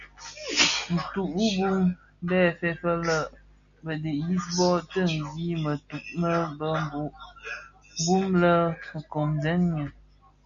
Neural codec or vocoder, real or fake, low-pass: none; real; 7.2 kHz